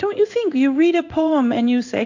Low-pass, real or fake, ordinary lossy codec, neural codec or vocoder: 7.2 kHz; fake; AAC, 48 kbps; codec, 16 kHz in and 24 kHz out, 1 kbps, XY-Tokenizer